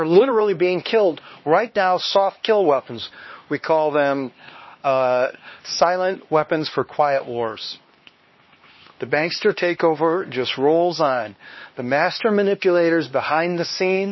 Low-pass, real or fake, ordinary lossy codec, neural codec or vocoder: 7.2 kHz; fake; MP3, 24 kbps; codec, 16 kHz, 2 kbps, X-Codec, HuBERT features, trained on LibriSpeech